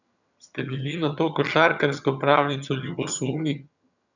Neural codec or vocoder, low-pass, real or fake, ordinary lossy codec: vocoder, 22.05 kHz, 80 mel bands, HiFi-GAN; 7.2 kHz; fake; none